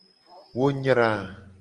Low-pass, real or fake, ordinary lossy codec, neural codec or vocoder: 10.8 kHz; real; Opus, 24 kbps; none